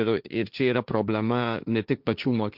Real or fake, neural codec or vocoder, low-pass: fake; codec, 16 kHz, 1.1 kbps, Voila-Tokenizer; 5.4 kHz